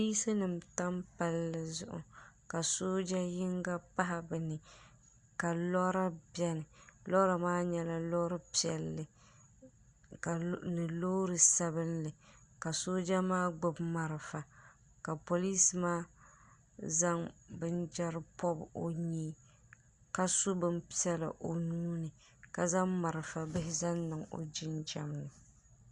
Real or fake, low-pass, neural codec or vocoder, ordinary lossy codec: real; 10.8 kHz; none; Opus, 64 kbps